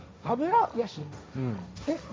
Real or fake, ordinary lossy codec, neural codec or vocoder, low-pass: fake; none; codec, 16 kHz, 1.1 kbps, Voila-Tokenizer; none